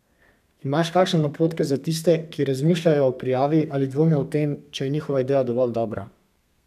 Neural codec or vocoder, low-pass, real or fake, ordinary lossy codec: codec, 32 kHz, 1.9 kbps, SNAC; 14.4 kHz; fake; none